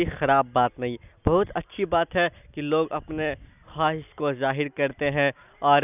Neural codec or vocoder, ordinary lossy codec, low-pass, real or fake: none; none; 3.6 kHz; real